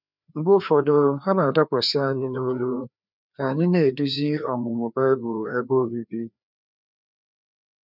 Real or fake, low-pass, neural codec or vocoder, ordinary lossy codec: fake; 5.4 kHz; codec, 16 kHz, 2 kbps, FreqCodec, larger model; none